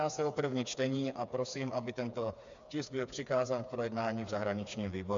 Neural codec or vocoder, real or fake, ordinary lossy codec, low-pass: codec, 16 kHz, 4 kbps, FreqCodec, smaller model; fake; MP3, 64 kbps; 7.2 kHz